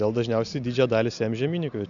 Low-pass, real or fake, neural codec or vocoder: 7.2 kHz; real; none